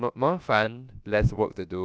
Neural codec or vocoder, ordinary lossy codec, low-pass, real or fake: codec, 16 kHz, about 1 kbps, DyCAST, with the encoder's durations; none; none; fake